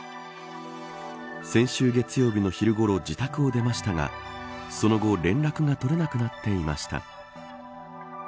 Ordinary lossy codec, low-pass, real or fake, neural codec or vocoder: none; none; real; none